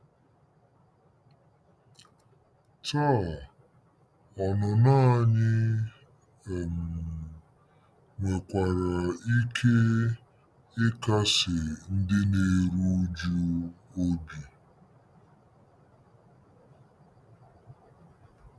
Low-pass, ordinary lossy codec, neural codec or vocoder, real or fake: none; none; none; real